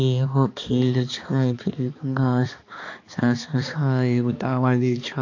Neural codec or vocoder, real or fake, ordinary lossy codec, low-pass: codec, 16 kHz, 2 kbps, X-Codec, HuBERT features, trained on balanced general audio; fake; AAC, 48 kbps; 7.2 kHz